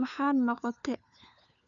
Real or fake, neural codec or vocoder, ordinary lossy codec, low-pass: fake; codec, 16 kHz, 4 kbps, FunCodec, trained on LibriTTS, 50 frames a second; none; 7.2 kHz